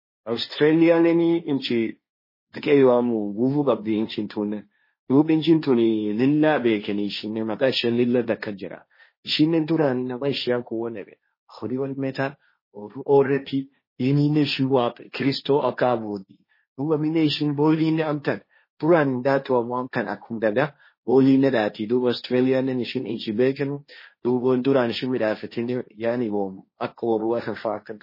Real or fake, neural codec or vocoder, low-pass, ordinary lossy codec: fake; codec, 16 kHz, 1.1 kbps, Voila-Tokenizer; 5.4 kHz; MP3, 24 kbps